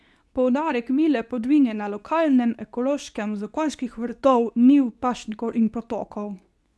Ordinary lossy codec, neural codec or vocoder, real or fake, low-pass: none; codec, 24 kHz, 0.9 kbps, WavTokenizer, medium speech release version 2; fake; none